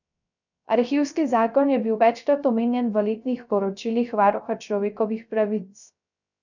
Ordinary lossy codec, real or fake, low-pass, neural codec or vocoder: none; fake; 7.2 kHz; codec, 16 kHz, 0.3 kbps, FocalCodec